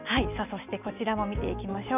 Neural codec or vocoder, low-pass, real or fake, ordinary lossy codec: none; 3.6 kHz; real; none